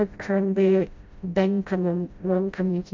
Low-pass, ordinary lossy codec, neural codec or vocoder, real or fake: 7.2 kHz; MP3, 48 kbps; codec, 16 kHz, 0.5 kbps, FreqCodec, smaller model; fake